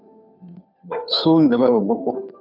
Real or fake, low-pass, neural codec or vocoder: fake; 5.4 kHz; codec, 16 kHz, 2 kbps, FunCodec, trained on Chinese and English, 25 frames a second